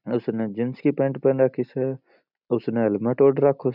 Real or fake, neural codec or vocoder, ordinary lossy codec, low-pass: real; none; none; 5.4 kHz